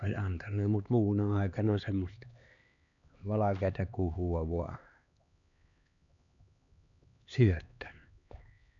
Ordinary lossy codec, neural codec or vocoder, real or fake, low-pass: none; codec, 16 kHz, 2 kbps, X-Codec, HuBERT features, trained on LibriSpeech; fake; 7.2 kHz